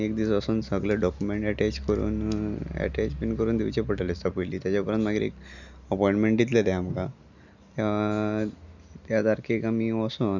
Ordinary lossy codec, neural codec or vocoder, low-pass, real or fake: none; none; 7.2 kHz; real